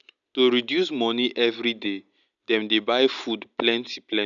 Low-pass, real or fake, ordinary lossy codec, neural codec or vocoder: 7.2 kHz; real; none; none